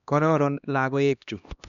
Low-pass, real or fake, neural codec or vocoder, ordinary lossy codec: 7.2 kHz; fake; codec, 16 kHz, 1 kbps, X-Codec, HuBERT features, trained on LibriSpeech; none